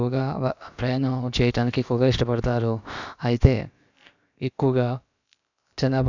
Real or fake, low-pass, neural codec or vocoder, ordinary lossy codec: fake; 7.2 kHz; codec, 16 kHz, about 1 kbps, DyCAST, with the encoder's durations; none